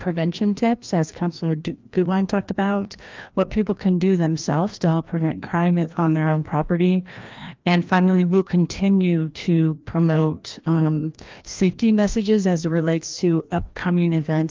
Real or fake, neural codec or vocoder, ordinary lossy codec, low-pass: fake; codec, 16 kHz, 1 kbps, FreqCodec, larger model; Opus, 24 kbps; 7.2 kHz